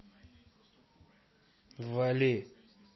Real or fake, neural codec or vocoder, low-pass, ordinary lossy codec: real; none; 7.2 kHz; MP3, 24 kbps